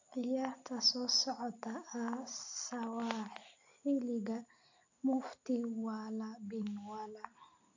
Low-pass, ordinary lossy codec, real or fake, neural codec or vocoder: 7.2 kHz; AAC, 48 kbps; real; none